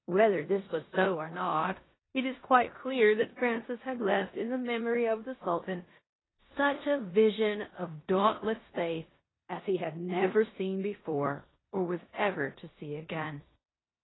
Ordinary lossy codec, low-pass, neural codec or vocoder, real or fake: AAC, 16 kbps; 7.2 kHz; codec, 16 kHz in and 24 kHz out, 0.9 kbps, LongCat-Audio-Codec, four codebook decoder; fake